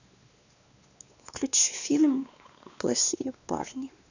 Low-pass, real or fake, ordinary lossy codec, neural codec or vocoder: 7.2 kHz; fake; none; codec, 16 kHz, 2 kbps, X-Codec, WavLM features, trained on Multilingual LibriSpeech